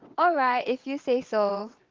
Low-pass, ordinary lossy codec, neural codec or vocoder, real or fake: 7.2 kHz; Opus, 24 kbps; vocoder, 22.05 kHz, 80 mel bands, WaveNeXt; fake